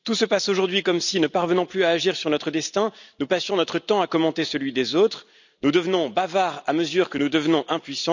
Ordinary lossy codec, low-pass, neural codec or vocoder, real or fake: none; 7.2 kHz; none; real